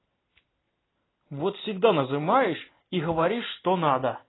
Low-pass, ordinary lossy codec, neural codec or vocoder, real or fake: 7.2 kHz; AAC, 16 kbps; none; real